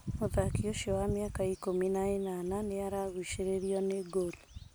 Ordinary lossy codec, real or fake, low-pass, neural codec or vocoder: none; real; none; none